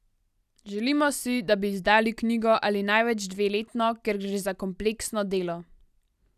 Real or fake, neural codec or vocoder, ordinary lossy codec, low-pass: real; none; none; 14.4 kHz